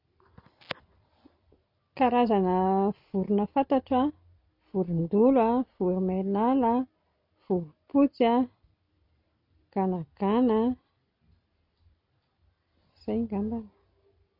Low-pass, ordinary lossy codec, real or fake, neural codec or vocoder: 5.4 kHz; none; real; none